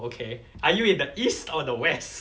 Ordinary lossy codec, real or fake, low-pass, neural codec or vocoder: none; real; none; none